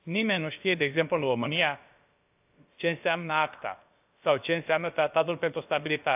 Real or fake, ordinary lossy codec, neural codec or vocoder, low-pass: fake; AAC, 32 kbps; codec, 16 kHz, about 1 kbps, DyCAST, with the encoder's durations; 3.6 kHz